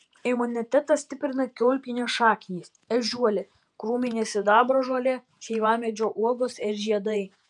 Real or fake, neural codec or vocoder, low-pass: fake; vocoder, 48 kHz, 128 mel bands, Vocos; 10.8 kHz